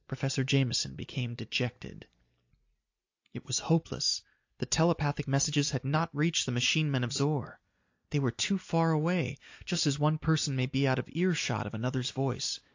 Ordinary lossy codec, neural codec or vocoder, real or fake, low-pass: AAC, 48 kbps; none; real; 7.2 kHz